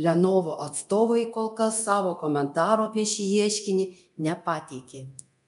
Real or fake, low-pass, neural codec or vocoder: fake; 10.8 kHz; codec, 24 kHz, 0.9 kbps, DualCodec